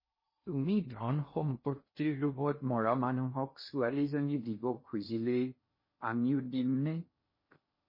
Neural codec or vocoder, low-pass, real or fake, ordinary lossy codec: codec, 16 kHz in and 24 kHz out, 0.6 kbps, FocalCodec, streaming, 2048 codes; 5.4 kHz; fake; MP3, 24 kbps